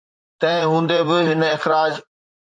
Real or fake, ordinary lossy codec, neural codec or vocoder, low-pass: fake; MP3, 48 kbps; vocoder, 44.1 kHz, 128 mel bands, Pupu-Vocoder; 9.9 kHz